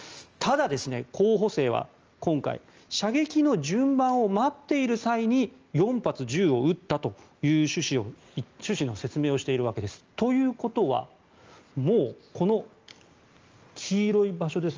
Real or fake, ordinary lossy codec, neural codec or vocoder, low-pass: real; Opus, 24 kbps; none; 7.2 kHz